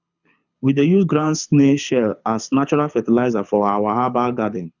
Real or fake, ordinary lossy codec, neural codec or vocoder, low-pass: fake; none; codec, 24 kHz, 6 kbps, HILCodec; 7.2 kHz